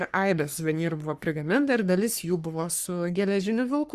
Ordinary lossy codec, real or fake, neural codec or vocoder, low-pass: Opus, 64 kbps; fake; codec, 44.1 kHz, 3.4 kbps, Pupu-Codec; 14.4 kHz